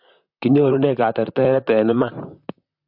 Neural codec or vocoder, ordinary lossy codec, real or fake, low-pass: vocoder, 44.1 kHz, 128 mel bands, Pupu-Vocoder; AAC, 48 kbps; fake; 5.4 kHz